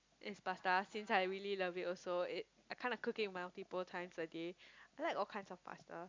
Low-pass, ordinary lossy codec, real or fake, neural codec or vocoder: 7.2 kHz; MP3, 64 kbps; real; none